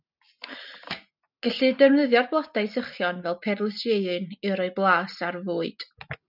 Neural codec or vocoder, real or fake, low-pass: none; real; 5.4 kHz